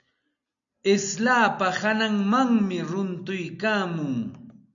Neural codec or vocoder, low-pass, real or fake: none; 7.2 kHz; real